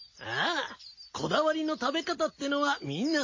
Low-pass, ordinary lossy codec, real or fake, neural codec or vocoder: 7.2 kHz; MP3, 32 kbps; real; none